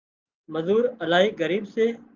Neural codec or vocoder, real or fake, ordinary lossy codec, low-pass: none; real; Opus, 32 kbps; 7.2 kHz